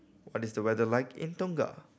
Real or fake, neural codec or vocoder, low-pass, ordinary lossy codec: real; none; none; none